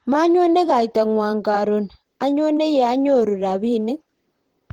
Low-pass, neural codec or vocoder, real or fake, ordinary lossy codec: 19.8 kHz; vocoder, 44.1 kHz, 128 mel bands, Pupu-Vocoder; fake; Opus, 16 kbps